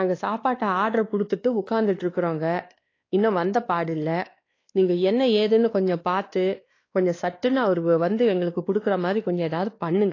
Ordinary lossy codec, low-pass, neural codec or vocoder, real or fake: AAC, 32 kbps; 7.2 kHz; codec, 16 kHz, 2 kbps, X-Codec, WavLM features, trained on Multilingual LibriSpeech; fake